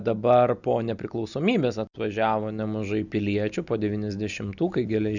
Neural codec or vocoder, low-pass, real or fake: none; 7.2 kHz; real